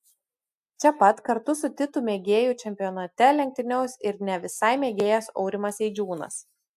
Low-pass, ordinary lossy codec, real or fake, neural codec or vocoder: 14.4 kHz; AAC, 96 kbps; real; none